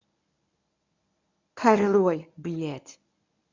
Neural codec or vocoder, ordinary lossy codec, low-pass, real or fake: codec, 24 kHz, 0.9 kbps, WavTokenizer, medium speech release version 1; none; 7.2 kHz; fake